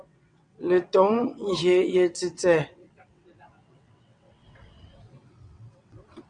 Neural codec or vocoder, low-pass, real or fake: vocoder, 22.05 kHz, 80 mel bands, WaveNeXt; 9.9 kHz; fake